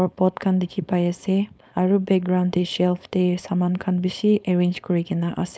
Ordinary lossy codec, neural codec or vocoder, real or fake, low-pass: none; codec, 16 kHz, 4.8 kbps, FACodec; fake; none